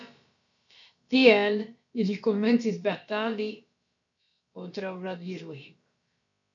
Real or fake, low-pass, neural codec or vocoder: fake; 7.2 kHz; codec, 16 kHz, about 1 kbps, DyCAST, with the encoder's durations